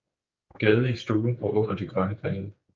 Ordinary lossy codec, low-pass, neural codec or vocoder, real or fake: Opus, 24 kbps; 7.2 kHz; codec, 16 kHz, 4 kbps, X-Codec, HuBERT features, trained on general audio; fake